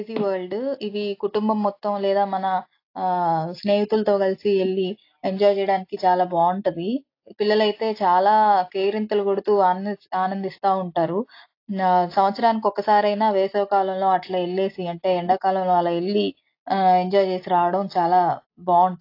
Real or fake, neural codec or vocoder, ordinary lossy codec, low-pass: real; none; AAC, 32 kbps; 5.4 kHz